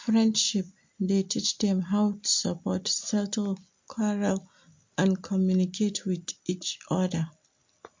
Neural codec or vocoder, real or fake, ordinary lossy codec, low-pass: none; real; MP3, 48 kbps; 7.2 kHz